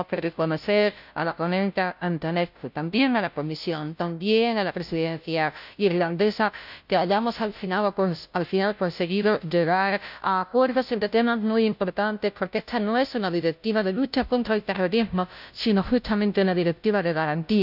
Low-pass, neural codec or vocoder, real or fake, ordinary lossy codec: 5.4 kHz; codec, 16 kHz, 0.5 kbps, FunCodec, trained on Chinese and English, 25 frames a second; fake; none